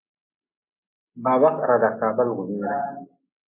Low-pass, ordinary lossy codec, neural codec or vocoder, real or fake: 3.6 kHz; MP3, 16 kbps; none; real